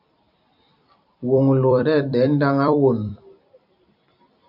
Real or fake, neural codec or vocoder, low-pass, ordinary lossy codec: fake; vocoder, 44.1 kHz, 128 mel bands every 256 samples, BigVGAN v2; 5.4 kHz; AAC, 48 kbps